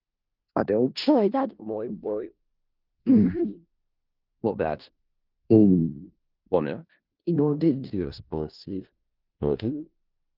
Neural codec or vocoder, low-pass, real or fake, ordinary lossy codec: codec, 16 kHz in and 24 kHz out, 0.4 kbps, LongCat-Audio-Codec, four codebook decoder; 5.4 kHz; fake; Opus, 32 kbps